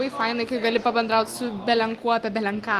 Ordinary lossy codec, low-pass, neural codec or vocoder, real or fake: Opus, 24 kbps; 14.4 kHz; none; real